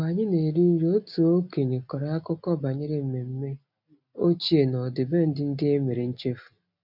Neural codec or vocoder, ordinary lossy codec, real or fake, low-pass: none; none; real; 5.4 kHz